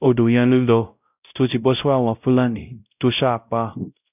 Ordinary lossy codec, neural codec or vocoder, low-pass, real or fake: none; codec, 16 kHz, 0.5 kbps, X-Codec, WavLM features, trained on Multilingual LibriSpeech; 3.6 kHz; fake